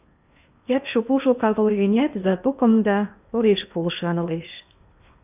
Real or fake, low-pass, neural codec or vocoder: fake; 3.6 kHz; codec, 16 kHz in and 24 kHz out, 0.8 kbps, FocalCodec, streaming, 65536 codes